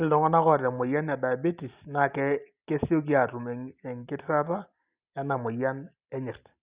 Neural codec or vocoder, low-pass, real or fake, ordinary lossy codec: none; 3.6 kHz; real; Opus, 64 kbps